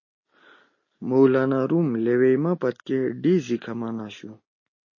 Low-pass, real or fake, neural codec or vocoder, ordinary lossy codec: 7.2 kHz; real; none; MP3, 32 kbps